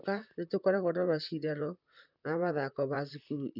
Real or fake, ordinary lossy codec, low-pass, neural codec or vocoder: fake; none; 5.4 kHz; vocoder, 44.1 kHz, 128 mel bands, Pupu-Vocoder